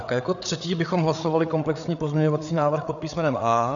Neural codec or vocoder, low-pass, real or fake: codec, 16 kHz, 8 kbps, FreqCodec, larger model; 7.2 kHz; fake